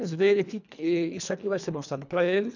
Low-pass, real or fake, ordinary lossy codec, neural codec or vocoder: 7.2 kHz; fake; none; codec, 24 kHz, 1.5 kbps, HILCodec